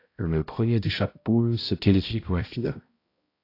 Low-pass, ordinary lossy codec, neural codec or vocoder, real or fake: 5.4 kHz; AAC, 32 kbps; codec, 16 kHz, 0.5 kbps, X-Codec, HuBERT features, trained on balanced general audio; fake